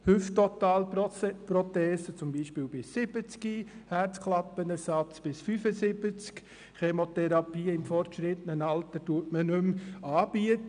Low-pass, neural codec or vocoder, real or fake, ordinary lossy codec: 9.9 kHz; none; real; none